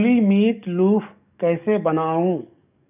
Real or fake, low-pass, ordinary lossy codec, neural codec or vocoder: fake; 3.6 kHz; none; codec, 44.1 kHz, 7.8 kbps, DAC